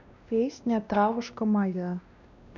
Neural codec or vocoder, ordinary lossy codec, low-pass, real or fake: codec, 16 kHz, 1 kbps, X-Codec, WavLM features, trained on Multilingual LibriSpeech; none; 7.2 kHz; fake